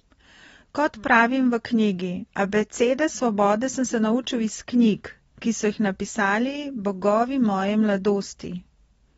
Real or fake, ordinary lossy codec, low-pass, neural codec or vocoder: real; AAC, 24 kbps; 19.8 kHz; none